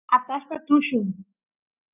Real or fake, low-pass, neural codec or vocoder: real; 3.6 kHz; none